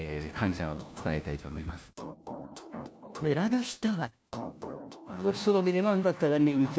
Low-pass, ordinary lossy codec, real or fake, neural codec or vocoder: none; none; fake; codec, 16 kHz, 1 kbps, FunCodec, trained on LibriTTS, 50 frames a second